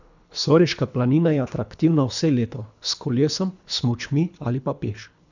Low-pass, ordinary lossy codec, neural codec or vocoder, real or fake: 7.2 kHz; none; codec, 24 kHz, 3 kbps, HILCodec; fake